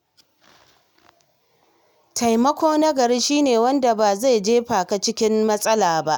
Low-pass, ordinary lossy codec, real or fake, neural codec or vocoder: none; none; real; none